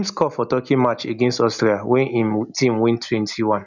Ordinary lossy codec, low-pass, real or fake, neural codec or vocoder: none; 7.2 kHz; real; none